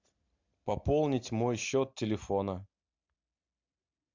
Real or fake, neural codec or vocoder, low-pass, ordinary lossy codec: real; none; 7.2 kHz; MP3, 64 kbps